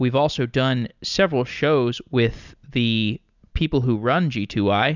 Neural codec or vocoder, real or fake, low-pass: none; real; 7.2 kHz